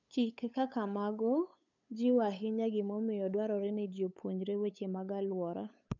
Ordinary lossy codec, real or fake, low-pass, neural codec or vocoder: none; fake; 7.2 kHz; codec, 16 kHz, 8 kbps, FunCodec, trained on LibriTTS, 25 frames a second